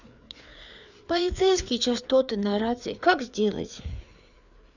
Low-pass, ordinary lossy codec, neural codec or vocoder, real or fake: 7.2 kHz; none; codec, 16 kHz, 4 kbps, FreqCodec, larger model; fake